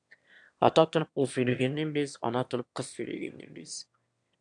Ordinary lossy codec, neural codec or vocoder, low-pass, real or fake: AAC, 64 kbps; autoencoder, 22.05 kHz, a latent of 192 numbers a frame, VITS, trained on one speaker; 9.9 kHz; fake